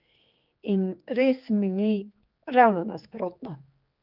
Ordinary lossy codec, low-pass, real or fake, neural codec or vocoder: Opus, 24 kbps; 5.4 kHz; fake; codec, 16 kHz, 4 kbps, X-Codec, HuBERT features, trained on general audio